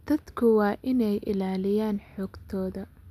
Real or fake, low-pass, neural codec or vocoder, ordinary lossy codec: real; 14.4 kHz; none; none